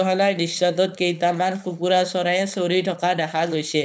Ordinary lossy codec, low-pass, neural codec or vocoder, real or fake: none; none; codec, 16 kHz, 4.8 kbps, FACodec; fake